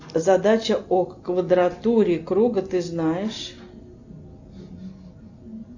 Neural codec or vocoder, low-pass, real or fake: none; 7.2 kHz; real